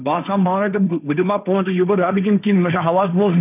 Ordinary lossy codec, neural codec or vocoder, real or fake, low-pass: none; codec, 16 kHz, 1.1 kbps, Voila-Tokenizer; fake; 3.6 kHz